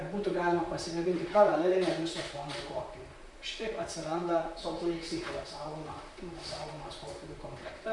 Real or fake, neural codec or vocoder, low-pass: fake; vocoder, 44.1 kHz, 128 mel bands, Pupu-Vocoder; 10.8 kHz